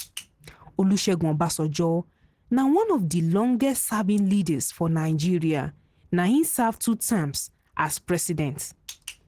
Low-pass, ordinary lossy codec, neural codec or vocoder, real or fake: 14.4 kHz; Opus, 16 kbps; none; real